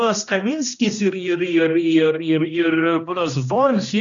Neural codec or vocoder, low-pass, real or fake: codec, 16 kHz, 1 kbps, X-Codec, HuBERT features, trained on general audio; 7.2 kHz; fake